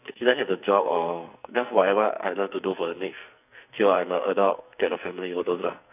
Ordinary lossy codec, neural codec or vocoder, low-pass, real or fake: none; codec, 44.1 kHz, 2.6 kbps, SNAC; 3.6 kHz; fake